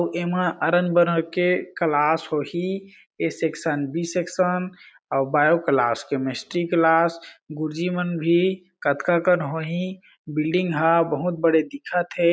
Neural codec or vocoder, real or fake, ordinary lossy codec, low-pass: none; real; none; none